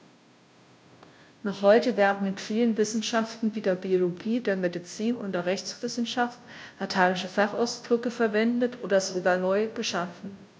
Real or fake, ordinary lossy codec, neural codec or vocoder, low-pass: fake; none; codec, 16 kHz, 0.5 kbps, FunCodec, trained on Chinese and English, 25 frames a second; none